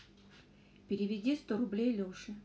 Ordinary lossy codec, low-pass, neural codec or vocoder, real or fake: none; none; none; real